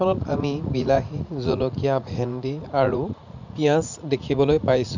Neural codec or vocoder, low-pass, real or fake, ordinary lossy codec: vocoder, 44.1 kHz, 80 mel bands, Vocos; 7.2 kHz; fake; none